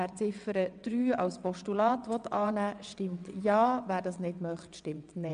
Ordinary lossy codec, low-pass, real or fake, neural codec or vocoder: none; 9.9 kHz; fake; vocoder, 22.05 kHz, 80 mel bands, WaveNeXt